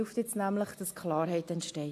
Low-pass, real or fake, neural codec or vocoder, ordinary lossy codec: 14.4 kHz; real; none; AAC, 64 kbps